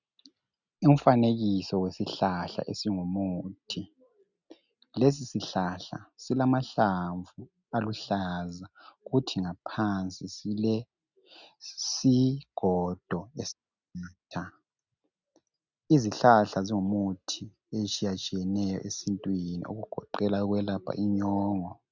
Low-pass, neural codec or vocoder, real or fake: 7.2 kHz; none; real